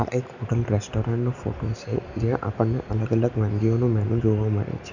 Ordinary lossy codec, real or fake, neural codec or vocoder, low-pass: none; real; none; 7.2 kHz